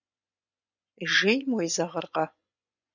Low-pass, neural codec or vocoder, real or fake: 7.2 kHz; vocoder, 24 kHz, 100 mel bands, Vocos; fake